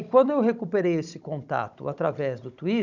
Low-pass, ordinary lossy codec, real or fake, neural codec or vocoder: 7.2 kHz; none; fake; codec, 16 kHz, 16 kbps, FunCodec, trained on Chinese and English, 50 frames a second